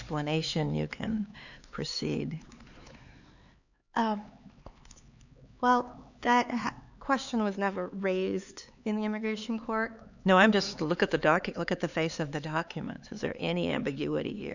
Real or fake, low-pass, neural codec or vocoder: fake; 7.2 kHz; codec, 16 kHz, 4 kbps, X-Codec, HuBERT features, trained on LibriSpeech